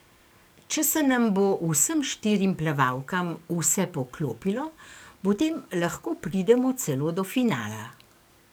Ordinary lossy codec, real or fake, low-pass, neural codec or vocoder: none; fake; none; codec, 44.1 kHz, 7.8 kbps, DAC